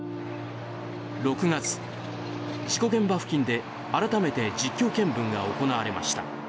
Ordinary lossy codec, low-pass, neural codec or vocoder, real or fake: none; none; none; real